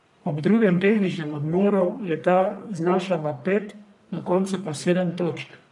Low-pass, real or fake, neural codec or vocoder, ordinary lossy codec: 10.8 kHz; fake; codec, 44.1 kHz, 1.7 kbps, Pupu-Codec; none